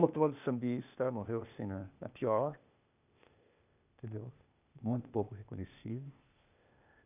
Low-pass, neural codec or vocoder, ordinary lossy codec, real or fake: 3.6 kHz; codec, 16 kHz, 0.8 kbps, ZipCodec; none; fake